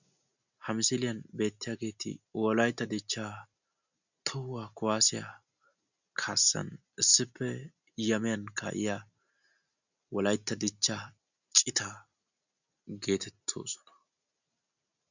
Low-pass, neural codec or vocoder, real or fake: 7.2 kHz; none; real